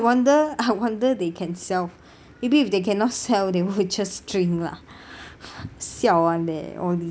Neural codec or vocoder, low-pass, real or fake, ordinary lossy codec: none; none; real; none